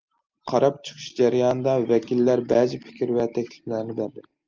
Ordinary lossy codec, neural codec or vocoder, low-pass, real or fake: Opus, 24 kbps; none; 7.2 kHz; real